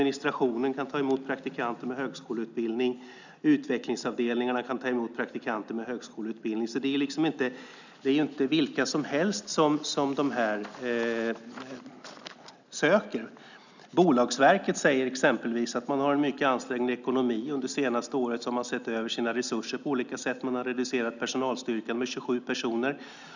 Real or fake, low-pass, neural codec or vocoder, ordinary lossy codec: real; 7.2 kHz; none; none